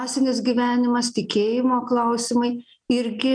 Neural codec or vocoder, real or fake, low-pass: none; real; 9.9 kHz